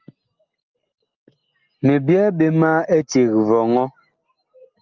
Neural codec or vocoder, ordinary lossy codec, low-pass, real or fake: none; Opus, 24 kbps; 7.2 kHz; real